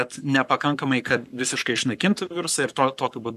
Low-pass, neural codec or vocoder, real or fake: 14.4 kHz; codec, 44.1 kHz, 7.8 kbps, Pupu-Codec; fake